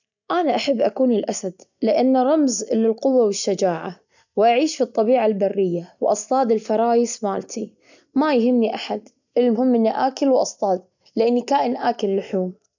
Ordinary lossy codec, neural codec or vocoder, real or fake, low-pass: none; none; real; 7.2 kHz